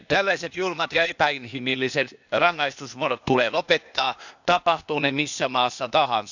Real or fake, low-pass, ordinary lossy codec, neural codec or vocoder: fake; 7.2 kHz; none; codec, 16 kHz, 0.8 kbps, ZipCodec